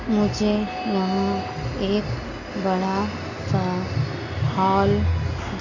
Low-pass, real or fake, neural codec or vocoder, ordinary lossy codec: 7.2 kHz; real; none; none